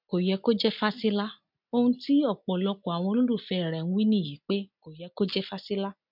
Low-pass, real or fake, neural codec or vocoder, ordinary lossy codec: 5.4 kHz; real; none; MP3, 48 kbps